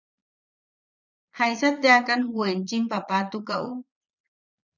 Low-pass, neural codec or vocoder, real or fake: 7.2 kHz; vocoder, 22.05 kHz, 80 mel bands, Vocos; fake